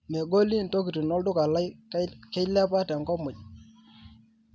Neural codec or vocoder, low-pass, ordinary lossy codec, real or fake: none; none; none; real